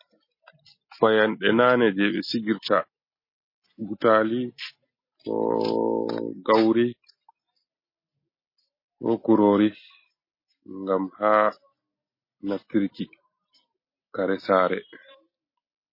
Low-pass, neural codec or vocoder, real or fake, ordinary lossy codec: 5.4 kHz; none; real; MP3, 24 kbps